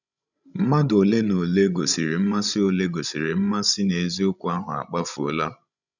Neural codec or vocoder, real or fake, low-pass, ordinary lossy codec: codec, 16 kHz, 16 kbps, FreqCodec, larger model; fake; 7.2 kHz; none